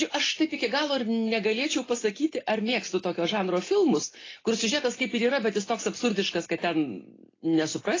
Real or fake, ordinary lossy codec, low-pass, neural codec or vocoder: real; AAC, 32 kbps; 7.2 kHz; none